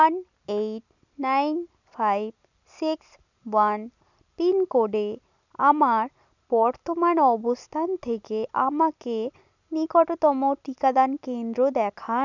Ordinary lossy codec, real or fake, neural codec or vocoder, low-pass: none; real; none; 7.2 kHz